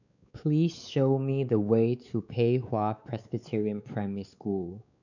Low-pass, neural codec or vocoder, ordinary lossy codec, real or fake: 7.2 kHz; codec, 16 kHz, 4 kbps, X-Codec, WavLM features, trained on Multilingual LibriSpeech; none; fake